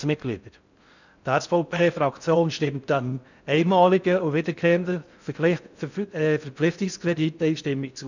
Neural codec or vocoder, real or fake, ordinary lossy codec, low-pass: codec, 16 kHz in and 24 kHz out, 0.6 kbps, FocalCodec, streaming, 4096 codes; fake; none; 7.2 kHz